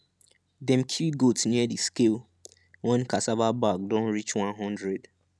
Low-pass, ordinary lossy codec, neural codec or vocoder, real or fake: none; none; none; real